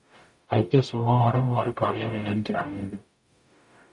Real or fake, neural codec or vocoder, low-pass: fake; codec, 44.1 kHz, 0.9 kbps, DAC; 10.8 kHz